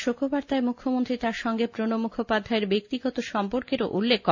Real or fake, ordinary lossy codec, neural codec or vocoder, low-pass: real; MP3, 48 kbps; none; 7.2 kHz